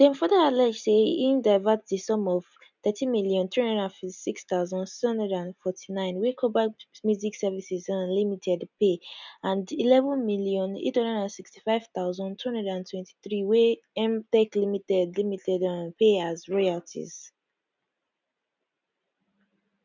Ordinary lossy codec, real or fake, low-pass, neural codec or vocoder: none; real; 7.2 kHz; none